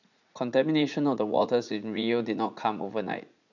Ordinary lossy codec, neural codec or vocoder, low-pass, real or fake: none; vocoder, 44.1 kHz, 80 mel bands, Vocos; 7.2 kHz; fake